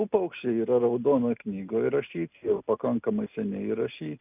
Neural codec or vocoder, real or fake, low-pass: none; real; 3.6 kHz